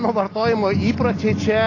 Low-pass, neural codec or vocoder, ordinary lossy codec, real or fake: 7.2 kHz; none; AAC, 32 kbps; real